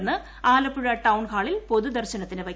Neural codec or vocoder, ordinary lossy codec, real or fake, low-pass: none; none; real; none